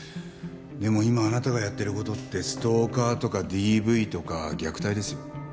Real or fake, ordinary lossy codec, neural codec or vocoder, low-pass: real; none; none; none